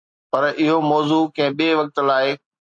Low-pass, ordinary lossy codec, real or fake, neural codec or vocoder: 9.9 kHz; MP3, 48 kbps; real; none